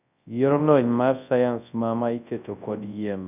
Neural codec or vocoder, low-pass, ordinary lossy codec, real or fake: codec, 24 kHz, 0.9 kbps, WavTokenizer, large speech release; 3.6 kHz; none; fake